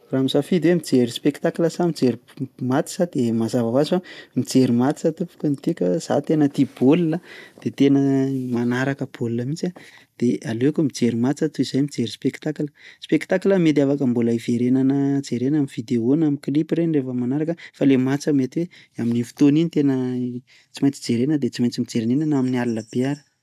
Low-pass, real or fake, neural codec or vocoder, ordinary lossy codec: 14.4 kHz; real; none; AAC, 96 kbps